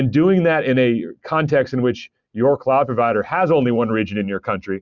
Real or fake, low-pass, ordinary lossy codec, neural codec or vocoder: real; 7.2 kHz; Opus, 64 kbps; none